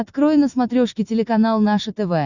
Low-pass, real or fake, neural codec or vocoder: 7.2 kHz; real; none